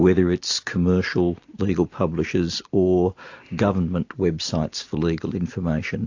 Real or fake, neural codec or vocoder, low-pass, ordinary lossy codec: real; none; 7.2 kHz; AAC, 48 kbps